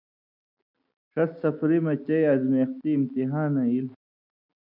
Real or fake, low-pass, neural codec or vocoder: real; 5.4 kHz; none